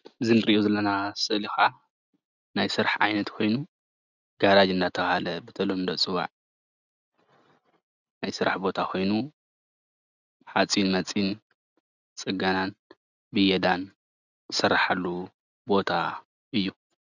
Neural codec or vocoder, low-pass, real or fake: none; 7.2 kHz; real